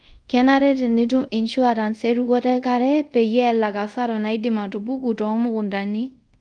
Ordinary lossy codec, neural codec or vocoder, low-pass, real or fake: Opus, 32 kbps; codec, 24 kHz, 0.5 kbps, DualCodec; 9.9 kHz; fake